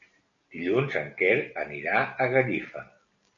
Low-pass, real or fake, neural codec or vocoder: 7.2 kHz; real; none